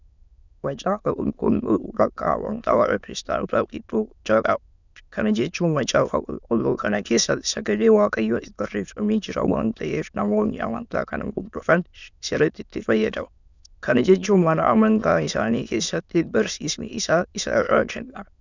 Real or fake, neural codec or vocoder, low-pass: fake; autoencoder, 22.05 kHz, a latent of 192 numbers a frame, VITS, trained on many speakers; 7.2 kHz